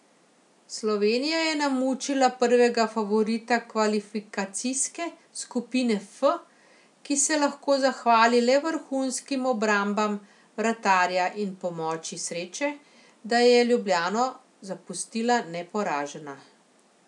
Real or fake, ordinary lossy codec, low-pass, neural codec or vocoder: real; none; 10.8 kHz; none